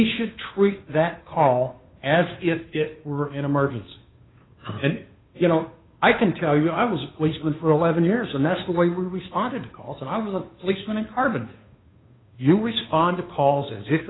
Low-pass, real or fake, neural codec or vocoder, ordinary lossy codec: 7.2 kHz; fake; codec, 16 kHz, 2 kbps, FunCodec, trained on Chinese and English, 25 frames a second; AAC, 16 kbps